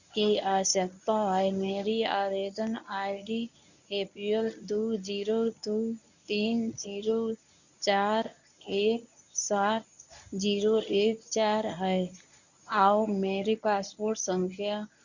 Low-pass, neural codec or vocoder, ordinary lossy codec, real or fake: 7.2 kHz; codec, 24 kHz, 0.9 kbps, WavTokenizer, medium speech release version 1; none; fake